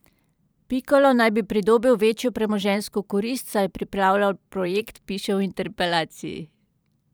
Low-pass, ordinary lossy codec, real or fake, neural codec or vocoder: none; none; fake; vocoder, 44.1 kHz, 128 mel bands every 512 samples, BigVGAN v2